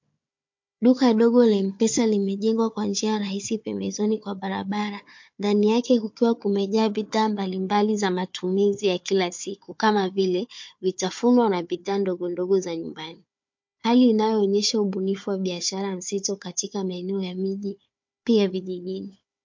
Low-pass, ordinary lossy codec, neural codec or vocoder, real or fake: 7.2 kHz; MP3, 48 kbps; codec, 16 kHz, 4 kbps, FunCodec, trained on Chinese and English, 50 frames a second; fake